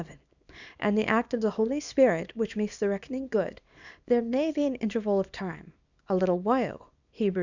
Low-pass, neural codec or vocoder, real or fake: 7.2 kHz; codec, 24 kHz, 0.9 kbps, WavTokenizer, small release; fake